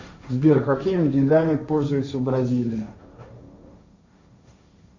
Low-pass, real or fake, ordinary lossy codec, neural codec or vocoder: 7.2 kHz; fake; AAC, 48 kbps; codec, 16 kHz, 1.1 kbps, Voila-Tokenizer